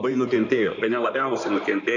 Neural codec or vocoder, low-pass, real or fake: codec, 16 kHz in and 24 kHz out, 2.2 kbps, FireRedTTS-2 codec; 7.2 kHz; fake